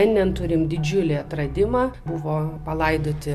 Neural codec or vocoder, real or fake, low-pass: none; real; 14.4 kHz